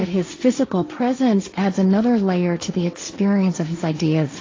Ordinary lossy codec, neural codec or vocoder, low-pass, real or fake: AAC, 32 kbps; codec, 16 kHz, 1.1 kbps, Voila-Tokenizer; 7.2 kHz; fake